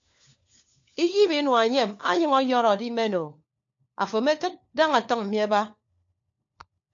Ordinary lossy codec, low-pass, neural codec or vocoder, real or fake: AAC, 64 kbps; 7.2 kHz; codec, 16 kHz, 4 kbps, FunCodec, trained on LibriTTS, 50 frames a second; fake